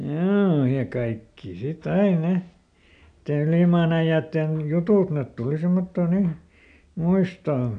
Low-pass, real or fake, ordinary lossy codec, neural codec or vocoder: 9.9 kHz; real; none; none